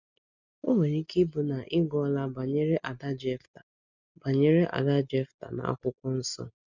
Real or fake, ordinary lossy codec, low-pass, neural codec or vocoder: real; none; 7.2 kHz; none